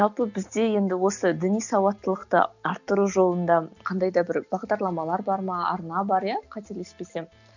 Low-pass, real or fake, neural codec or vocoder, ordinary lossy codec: 7.2 kHz; real; none; none